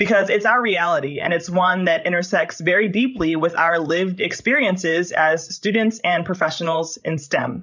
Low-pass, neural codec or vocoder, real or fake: 7.2 kHz; codec, 16 kHz, 16 kbps, FreqCodec, larger model; fake